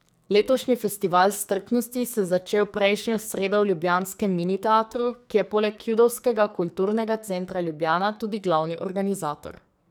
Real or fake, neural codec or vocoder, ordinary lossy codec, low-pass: fake; codec, 44.1 kHz, 2.6 kbps, SNAC; none; none